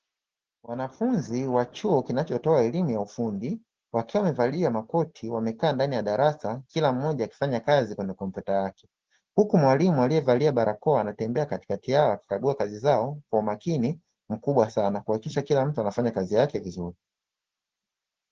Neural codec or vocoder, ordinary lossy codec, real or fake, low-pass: none; Opus, 16 kbps; real; 7.2 kHz